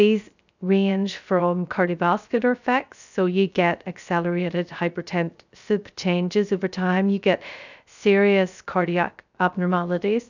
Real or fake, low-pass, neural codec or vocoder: fake; 7.2 kHz; codec, 16 kHz, 0.3 kbps, FocalCodec